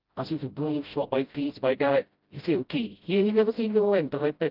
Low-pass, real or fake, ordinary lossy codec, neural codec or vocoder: 5.4 kHz; fake; Opus, 24 kbps; codec, 16 kHz, 0.5 kbps, FreqCodec, smaller model